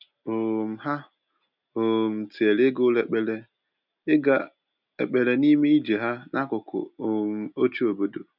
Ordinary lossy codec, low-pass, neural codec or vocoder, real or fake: none; 5.4 kHz; none; real